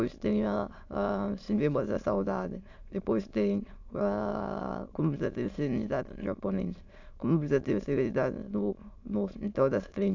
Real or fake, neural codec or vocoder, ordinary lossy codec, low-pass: fake; autoencoder, 22.05 kHz, a latent of 192 numbers a frame, VITS, trained on many speakers; AAC, 48 kbps; 7.2 kHz